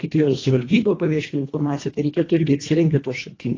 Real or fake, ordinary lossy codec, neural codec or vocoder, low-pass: fake; AAC, 32 kbps; codec, 24 kHz, 1.5 kbps, HILCodec; 7.2 kHz